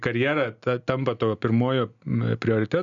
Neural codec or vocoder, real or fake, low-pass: none; real; 7.2 kHz